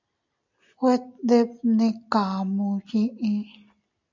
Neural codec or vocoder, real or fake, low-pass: none; real; 7.2 kHz